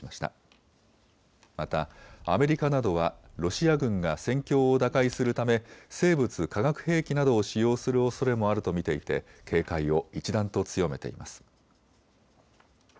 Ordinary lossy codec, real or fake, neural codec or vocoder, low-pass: none; real; none; none